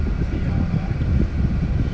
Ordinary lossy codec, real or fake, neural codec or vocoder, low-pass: none; real; none; none